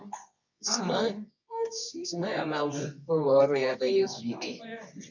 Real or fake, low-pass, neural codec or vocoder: fake; 7.2 kHz; codec, 24 kHz, 0.9 kbps, WavTokenizer, medium music audio release